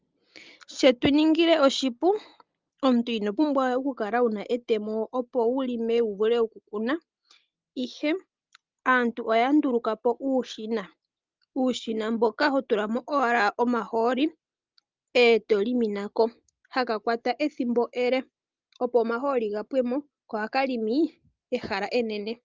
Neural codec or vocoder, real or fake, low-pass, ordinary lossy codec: none; real; 7.2 kHz; Opus, 32 kbps